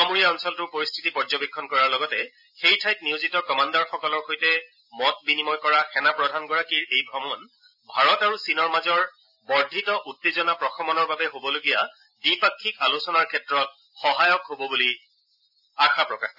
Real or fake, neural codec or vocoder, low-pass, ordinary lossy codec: real; none; 5.4 kHz; none